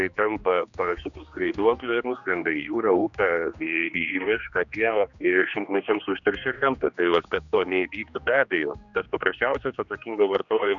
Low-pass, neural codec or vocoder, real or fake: 7.2 kHz; codec, 16 kHz, 2 kbps, X-Codec, HuBERT features, trained on general audio; fake